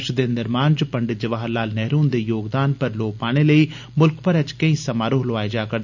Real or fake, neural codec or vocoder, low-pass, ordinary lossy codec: real; none; 7.2 kHz; none